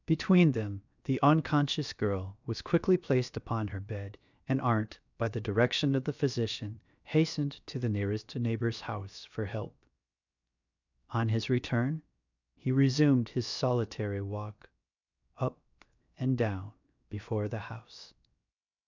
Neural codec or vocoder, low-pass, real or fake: codec, 16 kHz, 0.7 kbps, FocalCodec; 7.2 kHz; fake